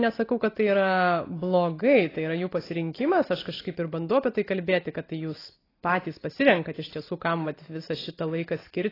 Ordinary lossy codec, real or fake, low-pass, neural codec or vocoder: AAC, 24 kbps; real; 5.4 kHz; none